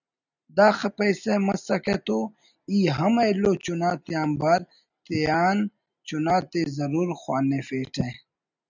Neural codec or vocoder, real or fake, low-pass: none; real; 7.2 kHz